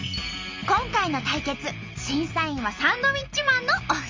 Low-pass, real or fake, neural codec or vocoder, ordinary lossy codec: 7.2 kHz; real; none; Opus, 32 kbps